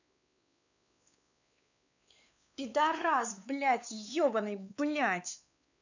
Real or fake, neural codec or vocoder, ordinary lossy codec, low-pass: fake; codec, 16 kHz, 4 kbps, X-Codec, WavLM features, trained on Multilingual LibriSpeech; none; 7.2 kHz